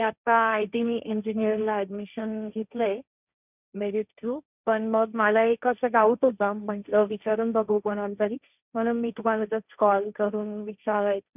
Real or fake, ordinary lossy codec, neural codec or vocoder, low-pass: fake; none; codec, 16 kHz, 1.1 kbps, Voila-Tokenizer; 3.6 kHz